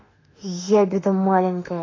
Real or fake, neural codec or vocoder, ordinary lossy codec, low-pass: fake; autoencoder, 48 kHz, 32 numbers a frame, DAC-VAE, trained on Japanese speech; AAC, 32 kbps; 7.2 kHz